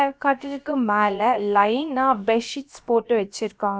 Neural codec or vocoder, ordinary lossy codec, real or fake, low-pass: codec, 16 kHz, about 1 kbps, DyCAST, with the encoder's durations; none; fake; none